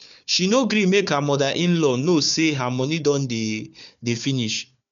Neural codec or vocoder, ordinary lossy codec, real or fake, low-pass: codec, 16 kHz, 4 kbps, FunCodec, trained on Chinese and English, 50 frames a second; none; fake; 7.2 kHz